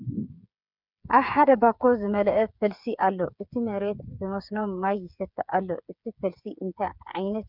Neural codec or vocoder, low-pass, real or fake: codec, 16 kHz, 8 kbps, FreqCodec, smaller model; 5.4 kHz; fake